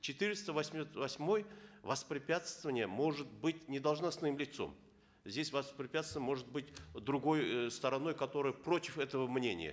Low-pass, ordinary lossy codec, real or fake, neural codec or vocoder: none; none; real; none